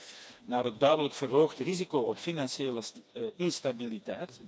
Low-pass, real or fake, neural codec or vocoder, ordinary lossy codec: none; fake; codec, 16 kHz, 2 kbps, FreqCodec, smaller model; none